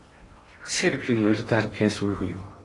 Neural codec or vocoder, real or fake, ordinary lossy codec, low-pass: codec, 16 kHz in and 24 kHz out, 0.6 kbps, FocalCodec, streaming, 2048 codes; fake; AAC, 32 kbps; 10.8 kHz